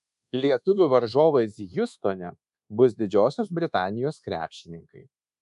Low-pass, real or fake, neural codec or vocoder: 10.8 kHz; fake; codec, 24 kHz, 1.2 kbps, DualCodec